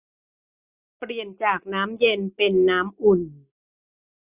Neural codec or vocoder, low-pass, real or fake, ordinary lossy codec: none; 3.6 kHz; real; Opus, 64 kbps